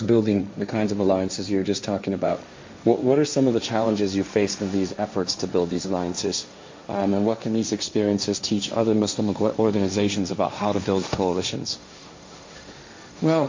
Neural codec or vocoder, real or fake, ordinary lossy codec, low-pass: codec, 16 kHz, 1.1 kbps, Voila-Tokenizer; fake; MP3, 48 kbps; 7.2 kHz